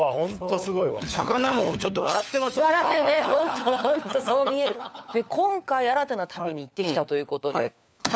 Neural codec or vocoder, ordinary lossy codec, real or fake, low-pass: codec, 16 kHz, 4 kbps, FunCodec, trained on LibriTTS, 50 frames a second; none; fake; none